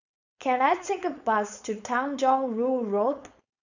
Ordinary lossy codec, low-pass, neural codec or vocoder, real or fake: none; 7.2 kHz; codec, 16 kHz, 4.8 kbps, FACodec; fake